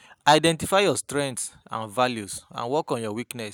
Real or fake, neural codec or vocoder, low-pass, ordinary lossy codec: real; none; none; none